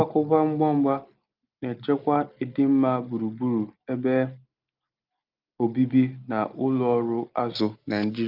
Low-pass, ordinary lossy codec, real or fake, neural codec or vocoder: 5.4 kHz; Opus, 24 kbps; real; none